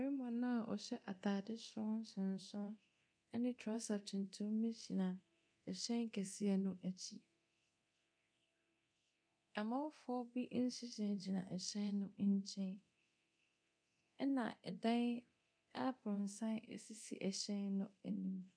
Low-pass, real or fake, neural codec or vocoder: 9.9 kHz; fake; codec, 24 kHz, 0.9 kbps, DualCodec